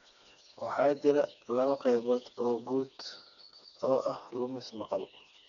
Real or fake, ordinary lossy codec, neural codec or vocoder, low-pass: fake; none; codec, 16 kHz, 2 kbps, FreqCodec, smaller model; 7.2 kHz